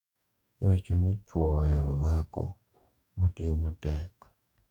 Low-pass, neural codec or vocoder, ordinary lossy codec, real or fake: 19.8 kHz; codec, 44.1 kHz, 2.6 kbps, DAC; none; fake